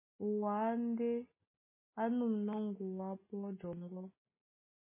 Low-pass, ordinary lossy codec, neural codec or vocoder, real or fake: 3.6 kHz; MP3, 16 kbps; none; real